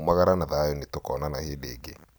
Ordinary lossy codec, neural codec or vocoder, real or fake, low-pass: none; vocoder, 44.1 kHz, 128 mel bands every 256 samples, BigVGAN v2; fake; none